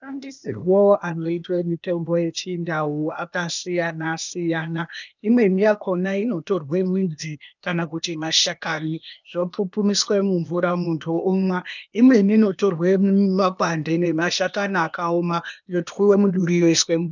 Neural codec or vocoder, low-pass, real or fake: codec, 16 kHz, 0.8 kbps, ZipCodec; 7.2 kHz; fake